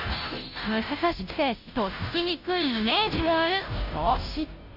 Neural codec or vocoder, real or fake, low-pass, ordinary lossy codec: codec, 16 kHz, 0.5 kbps, FunCodec, trained on Chinese and English, 25 frames a second; fake; 5.4 kHz; AAC, 48 kbps